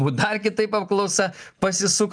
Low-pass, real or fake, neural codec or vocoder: 9.9 kHz; real; none